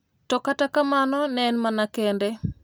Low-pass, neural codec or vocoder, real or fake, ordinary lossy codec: none; none; real; none